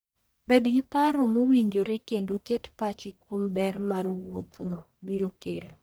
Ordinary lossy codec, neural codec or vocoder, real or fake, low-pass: none; codec, 44.1 kHz, 1.7 kbps, Pupu-Codec; fake; none